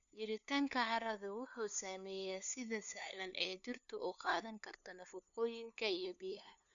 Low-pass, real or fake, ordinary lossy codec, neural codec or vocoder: 7.2 kHz; fake; none; codec, 16 kHz, 2 kbps, FunCodec, trained on LibriTTS, 25 frames a second